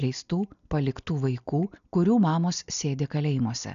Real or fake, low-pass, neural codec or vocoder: real; 7.2 kHz; none